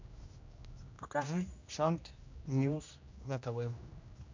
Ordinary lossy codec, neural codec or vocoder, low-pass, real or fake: MP3, 64 kbps; codec, 16 kHz, 1 kbps, X-Codec, HuBERT features, trained on general audio; 7.2 kHz; fake